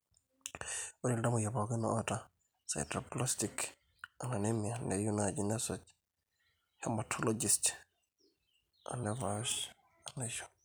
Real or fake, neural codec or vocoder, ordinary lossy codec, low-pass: real; none; none; none